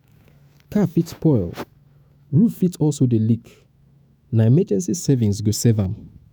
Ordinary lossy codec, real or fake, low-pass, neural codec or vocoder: none; fake; none; autoencoder, 48 kHz, 128 numbers a frame, DAC-VAE, trained on Japanese speech